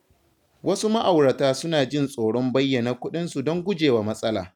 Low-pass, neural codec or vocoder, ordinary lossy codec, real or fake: 19.8 kHz; none; none; real